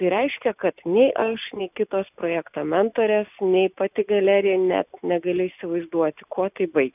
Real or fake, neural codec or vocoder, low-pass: real; none; 3.6 kHz